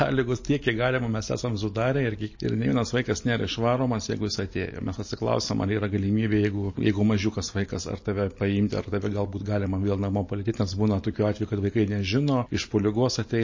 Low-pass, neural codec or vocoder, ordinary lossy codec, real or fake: 7.2 kHz; none; MP3, 32 kbps; real